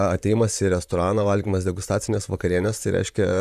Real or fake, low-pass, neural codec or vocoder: fake; 14.4 kHz; vocoder, 44.1 kHz, 128 mel bands, Pupu-Vocoder